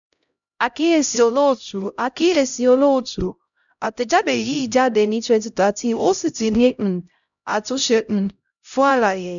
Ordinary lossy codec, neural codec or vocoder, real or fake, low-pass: none; codec, 16 kHz, 0.5 kbps, X-Codec, HuBERT features, trained on LibriSpeech; fake; 7.2 kHz